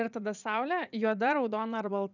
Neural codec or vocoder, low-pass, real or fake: vocoder, 44.1 kHz, 80 mel bands, Vocos; 7.2 kHz; fake